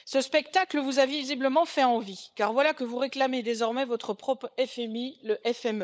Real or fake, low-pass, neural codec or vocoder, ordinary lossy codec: fake; none; codec, 16 kHz, 16 kbps, FunCodec, trained on LibriTTS, 50 frames a second; none